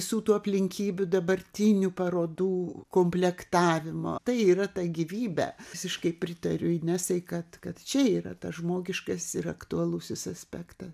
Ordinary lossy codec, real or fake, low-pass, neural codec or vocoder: MP3, 96 kbps; real; 14.4 kHz; none